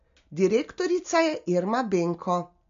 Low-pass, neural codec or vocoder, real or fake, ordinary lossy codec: 7.2 kHz; none; real; MP3, 48 kbps